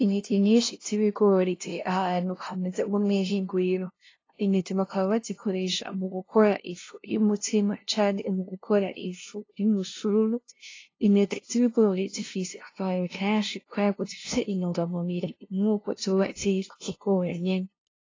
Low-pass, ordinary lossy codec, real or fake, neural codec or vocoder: 7.2 kHz; AAC, 32 kbps; fake; codec, 16 kHz, 0.5 kbps, FunCodec, trained on LibriTTS, 25 frames a second